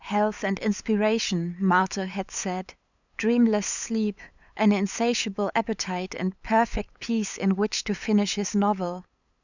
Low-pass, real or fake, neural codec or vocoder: 7.2 kHz; fake; codec, 16 kHz, 8 kbps, FunCodec, trained on LibriTTS, 25 frames a second